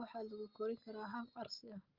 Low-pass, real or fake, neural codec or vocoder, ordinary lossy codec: 5.4 kHz; real; none; Opus, 32 kbps